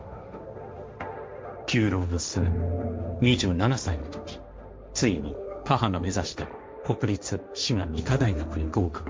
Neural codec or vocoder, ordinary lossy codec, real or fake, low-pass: codec, 16 kHz, 1.1 kbps, Voila-Tokenizer; none; fake; none